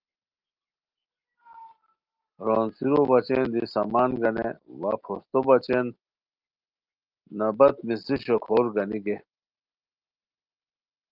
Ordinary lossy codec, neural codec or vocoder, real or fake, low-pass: Opus, 32 kbps; none; real; 5.4 kHz